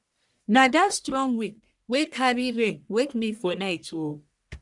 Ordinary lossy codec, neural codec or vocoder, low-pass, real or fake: none; codec, 44.1 kHz, 1.7 kbps, Pupu-Codec; 10.8 kHz; fake